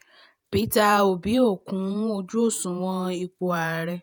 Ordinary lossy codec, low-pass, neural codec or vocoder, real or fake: none; none; vocoder, 48 kHz, 128 mel bands, Vocos; fake